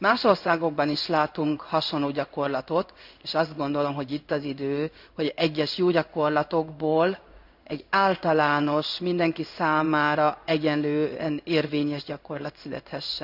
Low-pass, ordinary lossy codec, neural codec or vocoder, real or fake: 5.4 kHz; none; codec, 16 kHz in and 24 kHz out, 1 kbps, XY-Tokenizer; fake